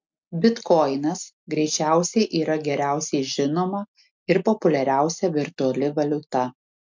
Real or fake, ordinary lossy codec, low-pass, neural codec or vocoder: real; MP3, 64 kbps; 7.2 kHz; none